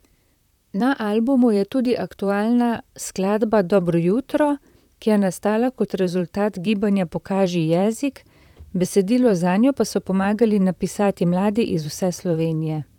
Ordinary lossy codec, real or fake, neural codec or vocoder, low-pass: none; fake; vocoder, 44.1 kHz, 128 mel bands, Pupu-Vocoder; 19.8 kHz